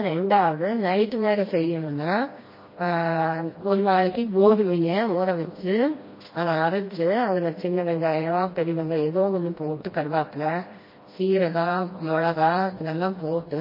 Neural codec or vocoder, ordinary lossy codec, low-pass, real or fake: codec, 16 kHz, 1 kbps, FreqCodec, smaller model; MP3, 24 kbps; 5.4 kHz; fake